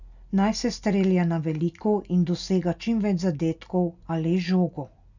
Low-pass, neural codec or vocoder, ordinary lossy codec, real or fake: 7.2 kHz; none; none; real